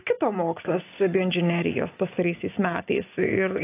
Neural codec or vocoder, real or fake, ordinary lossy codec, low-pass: none; real; AAC, 24 kbps; 3.6 kHz